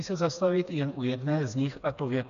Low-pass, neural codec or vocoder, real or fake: 7.2 kHz; codec, 16 kHz, 2 kbps, FreqCodec, smaller model; fake